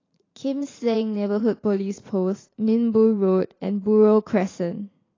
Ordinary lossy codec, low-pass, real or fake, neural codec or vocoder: AAC, 32 kbps; 7.2 kHz; fake; vocoder, 44.1 kHz, 80 mel bands, Vocos